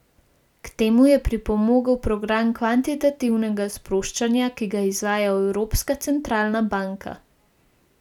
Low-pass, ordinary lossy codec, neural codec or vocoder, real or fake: 19.8 kHz; none; none; real